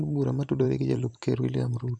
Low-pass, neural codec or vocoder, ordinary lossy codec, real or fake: 9.9 kHz; vocoder, 44.1 kHz, 128 mel bands every 512 samples, BigVGAN v2; none; fake